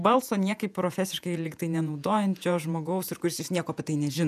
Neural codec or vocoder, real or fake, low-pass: vocoder, 48 kHz, 128 mel bands, Vocos; fake; 14.4 kHz